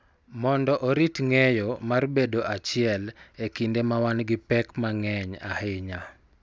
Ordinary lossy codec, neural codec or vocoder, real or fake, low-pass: none; none; real; none